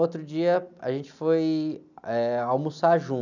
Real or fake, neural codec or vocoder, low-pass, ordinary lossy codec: real; none; 7.2 kHz; none